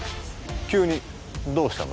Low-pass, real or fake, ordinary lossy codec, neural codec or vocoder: none; real; none; none